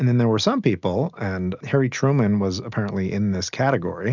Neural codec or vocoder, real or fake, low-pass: none; real; 7.2 kHz